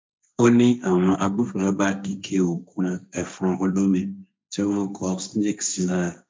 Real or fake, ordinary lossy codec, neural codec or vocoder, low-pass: fake; none; codec, 16 kHz, 1.1 kbps, Voila-Tokenizer; none